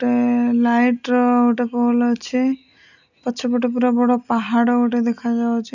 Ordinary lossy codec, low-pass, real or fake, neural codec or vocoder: none; 7.2 kHz; real; none